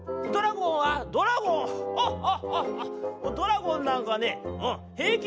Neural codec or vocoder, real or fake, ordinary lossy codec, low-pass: none; real; none; none